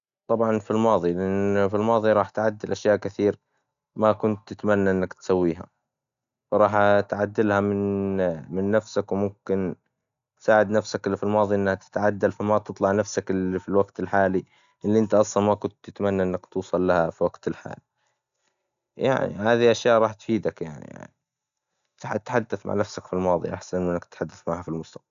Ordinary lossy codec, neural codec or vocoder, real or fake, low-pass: AAC, 96 kbps; none; real; 7.2 kHz